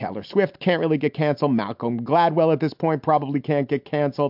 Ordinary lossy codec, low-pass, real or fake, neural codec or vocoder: MP3, 48 kbps; 5.4 kHz; real; none